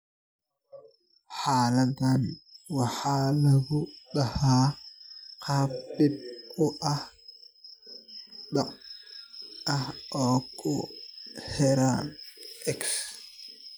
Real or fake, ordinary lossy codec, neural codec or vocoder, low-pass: real; none; none; none